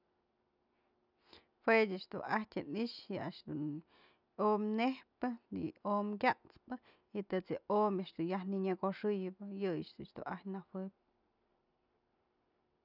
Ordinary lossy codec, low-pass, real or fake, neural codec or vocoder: none; 5.4 kHz; real; none